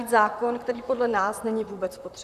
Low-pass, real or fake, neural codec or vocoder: 14.4 kHz; fake; vocoder, 44.1 kHz, 128 mel bands, Pupu-Vocoder